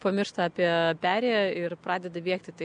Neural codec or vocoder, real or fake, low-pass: none; real; 9.9 kHz